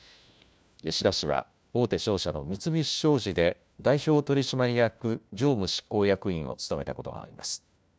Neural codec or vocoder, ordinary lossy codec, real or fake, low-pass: codec, 16 kHz, 1 kbps, FunCodec, trained on LibriTTS, 50 frames a second; none; fake; none